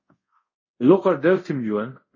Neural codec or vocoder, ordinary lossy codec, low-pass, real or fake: codec, 24 kHz, 0.5 kbps, DualCodec; MP3, 32 kbps; 7.2 kHz; fake